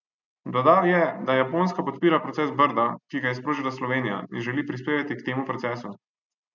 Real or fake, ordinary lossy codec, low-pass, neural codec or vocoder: real; none; 7.2 kHz; none